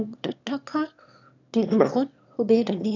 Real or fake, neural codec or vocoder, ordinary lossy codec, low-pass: fake; autoencoder, 22.05 kHz, a latent of 192 numbers a frame, VITS, trained on one speaker; none; 7.2 kHz